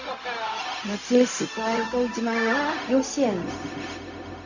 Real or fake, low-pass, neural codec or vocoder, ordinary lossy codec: fake; 7.2 kHz; codec, 16 kHz, 0.4 kbps, LongCat-Audio-Codec; none